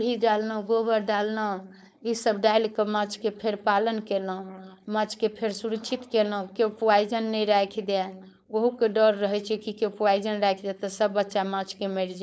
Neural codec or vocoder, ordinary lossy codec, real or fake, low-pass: codec, 16 kHz, 4.8 kbps, FACodec; none; fake; none